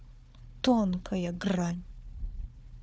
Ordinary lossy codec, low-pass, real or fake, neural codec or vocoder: none; none; fake; codec, 16 kHz, 4 kbps, FunCodec, trained on Chinese and English, 50 frames a second